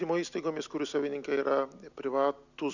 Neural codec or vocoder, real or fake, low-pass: none; real; 7.2 kHz